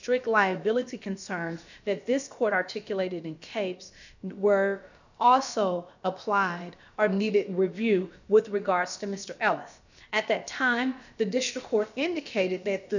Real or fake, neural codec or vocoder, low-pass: fake; codec, 16 kHz, about 1 kbps, DyCAST, with the encoder's durations; 7.2 kHz